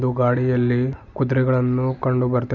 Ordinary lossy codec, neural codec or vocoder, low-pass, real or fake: none; none; 7.2 kHz; real